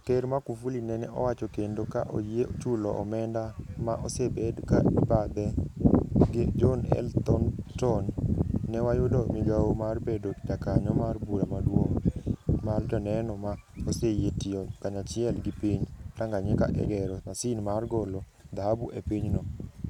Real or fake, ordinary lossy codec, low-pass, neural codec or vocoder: real; none; 19.8 kHz; none